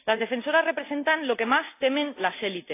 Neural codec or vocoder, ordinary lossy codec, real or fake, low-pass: none; AAC, 24 kbps; real; 3.6 kHz